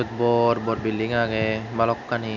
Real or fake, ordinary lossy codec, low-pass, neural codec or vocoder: real; none; 7.2 kHz; none